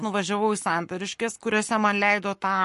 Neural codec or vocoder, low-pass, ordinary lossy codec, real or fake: codec, 44.1 kHz, 7.8 kbps, Pupu-Codec; 14.4 kHz; MP3, 48 kbps; fake